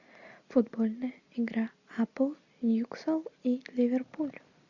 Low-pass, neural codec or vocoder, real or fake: 7.2 kHz; none; real